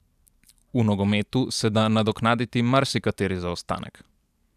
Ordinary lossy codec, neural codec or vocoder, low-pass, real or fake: none; vocoder, 44.1 kHz, 128 mel bands every 512 samples, BigVGAN v2; 14.4 kHz; fake